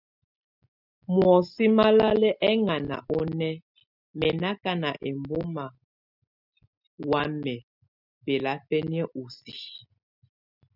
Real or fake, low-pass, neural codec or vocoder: real; 5.4 kHz; none